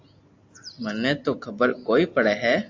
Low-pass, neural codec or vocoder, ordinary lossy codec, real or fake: 7.2 kHz; none; AAC, 48 kbps; real